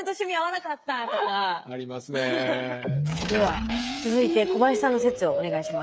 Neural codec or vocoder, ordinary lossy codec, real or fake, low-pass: codec, 16 kHz, 8 kbps, FreqCodec, smaller model; none; fake; none